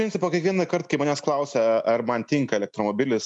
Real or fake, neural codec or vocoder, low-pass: real; none; 10.8 kHz